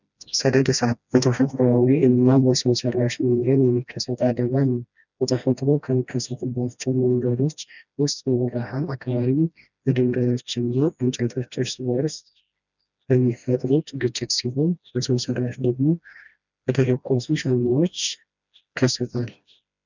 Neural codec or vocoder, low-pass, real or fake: codec, 16 kHz, 1 kbps, FreqCodec, smaller model; 7.2 kHz; fake